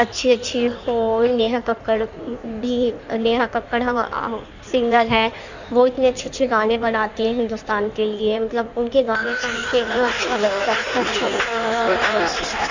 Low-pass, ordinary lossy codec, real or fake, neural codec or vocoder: 7.2 kHz; none; fake; codec, 16 kHz in and 24 kHz out, 1.1 kbps, FireRedTTS-2 codec